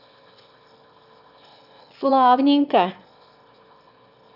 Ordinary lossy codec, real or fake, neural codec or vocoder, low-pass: none; fake; autoencoder, 22.05 kHz, a latent of 192 numbers a frame, VITS, trained on one speaker; 5.4 kHz